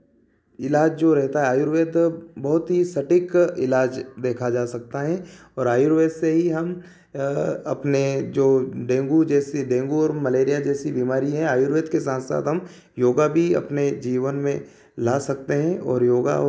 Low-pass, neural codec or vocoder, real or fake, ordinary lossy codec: none; none; real; none